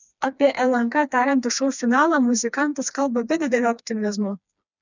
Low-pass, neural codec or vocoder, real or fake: 7.2 kHz; codec, 16 kHz, 2 kbps, FreqCodec, smaller model; fake